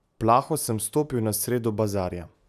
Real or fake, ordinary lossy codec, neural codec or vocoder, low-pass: real; none; none; 14.4 kHz